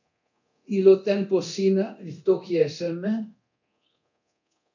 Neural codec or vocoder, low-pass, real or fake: codec, 24 kHz, 0.9 kbps, DualCodec; 7.2 kHz; fake